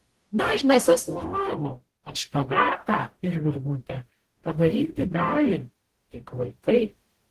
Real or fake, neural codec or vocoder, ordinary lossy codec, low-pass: fake; codec, 44.1 kHz, 0.9 kbps, DAC; Opus, 16 kbps; 14.4 kHz